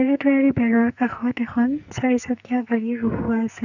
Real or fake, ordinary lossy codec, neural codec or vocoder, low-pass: fake; none; codec, 44.1 kHz, 2.6 kbps, SNAC; 7.2 kHz